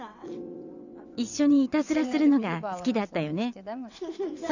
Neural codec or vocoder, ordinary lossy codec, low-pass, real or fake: vocoder, 22.05 kHz, 80 mel bands, WaveNeXt; none; 7.2 kHz; fake